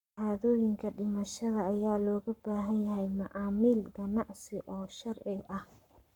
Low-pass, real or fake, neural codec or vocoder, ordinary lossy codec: 19.8 kHz; fake; codec, 44.1 kHz, 7.8 kbps, Pupu-Codec; none